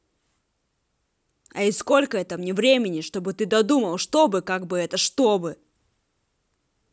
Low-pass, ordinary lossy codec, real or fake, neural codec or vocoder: none; none; real; none